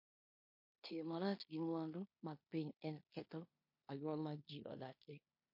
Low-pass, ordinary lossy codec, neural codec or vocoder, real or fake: 5.4 kHz; MP3, 32 kbps; codec, 16 kHz in and 24 kHz out, 0.9 kbps, LongCat-Audio-Codec, four codebook decoder; fake